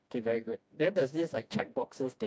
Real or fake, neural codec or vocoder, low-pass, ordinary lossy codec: fake; codec, 16 kHz, 1 kbps, FreqCodec, smaller model; none; none